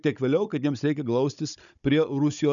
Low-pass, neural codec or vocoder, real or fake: 7.2 kHz; codec, 16 kHz, 16 kbps, FunCodec, trained on Chinese and English, 50 frames a second; fake